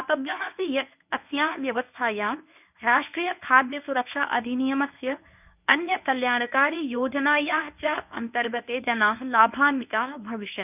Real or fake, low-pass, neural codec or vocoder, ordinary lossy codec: fake; 3.6 kHz; codec, 24 kHz, 0.9 kbps, WavTokenizer, medium speech release version 1; none